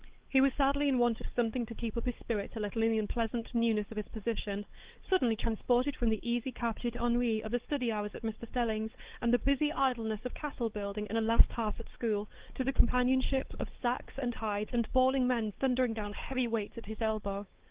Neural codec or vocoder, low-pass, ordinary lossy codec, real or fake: codec, 16 kHz, 4 kbps, X-Codec, WavLM features, trained on Multilingual LibriSpeech; 3.6 kHz; Opus, 16 kbps; fake